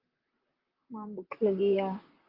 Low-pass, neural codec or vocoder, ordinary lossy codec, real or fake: 5.4 kHz; vocoder, 44.1 kHz, 128 mel bands, Pupu-Vocoder; Opus, 32 kbps; fake